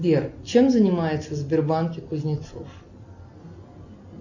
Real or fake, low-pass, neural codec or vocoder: real; 7.2 kHz; none